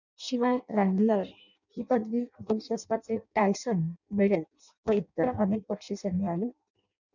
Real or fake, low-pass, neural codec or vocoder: fake; 7.2 kHz; codec, 16 kHz in and 24 kHz out, 0.6 kbps, FireRedTTS-2 codec